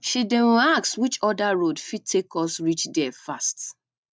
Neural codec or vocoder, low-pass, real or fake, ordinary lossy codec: none; none; real; none